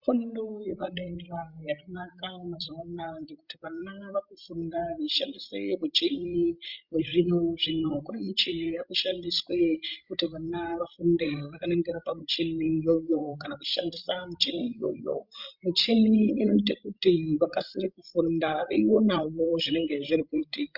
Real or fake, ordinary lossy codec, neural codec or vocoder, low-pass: fake; Opus, 64 kbps; codec, 16 kHz, 16 kbps, FreqCodec, larger model; 5.4 kHz